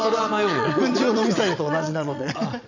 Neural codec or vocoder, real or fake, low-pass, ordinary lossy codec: vocoder, 22.05 kHz, 80 mel bands, Vocos; fake; 7.2 kHz; none